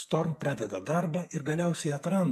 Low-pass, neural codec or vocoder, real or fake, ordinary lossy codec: 14.4 kHz; codec, 44.1 kHz, 2.6 kbps, SNAC; fake; MP3, 96 kbps